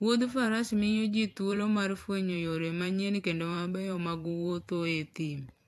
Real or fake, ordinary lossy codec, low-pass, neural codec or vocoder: fake; AAC, 64 kbps; 14.4 kHz; vocoder, 44.1 kHz, 128 mel bands every 512 samples, BigVGAN v2